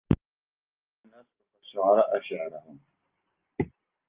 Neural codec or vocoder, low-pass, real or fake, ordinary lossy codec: none; 3.6 kHz; real; Opus, 32 kbps